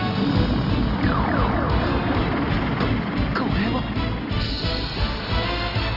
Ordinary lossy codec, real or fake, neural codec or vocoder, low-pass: Opus, 24 kbps; real; none; 5.4 kHz